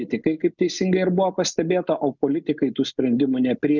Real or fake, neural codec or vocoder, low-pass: real; none; 7.2 kHz